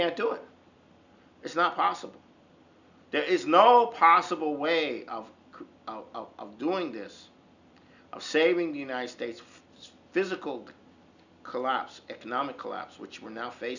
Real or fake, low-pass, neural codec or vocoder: real; 7.2 kHz; none